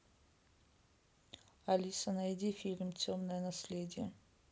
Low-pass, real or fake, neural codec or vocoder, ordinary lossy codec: none; real; none; none